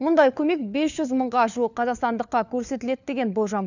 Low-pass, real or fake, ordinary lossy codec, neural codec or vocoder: 7.2 kHz; fake; none; codec, 16 kHz, 4 kbps, FunCodec, trained on LibriTTS, 50 frames a second